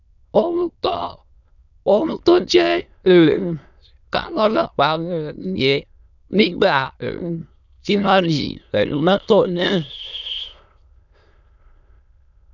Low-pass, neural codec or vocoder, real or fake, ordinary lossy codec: 7.2 kHz; autoencoder, 22.05 kHz, a latent of 192 numbers a frame, VITS, trained on many speakers; fake; none